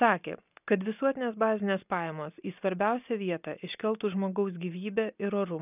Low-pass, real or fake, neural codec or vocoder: 3.6 kHz; real; none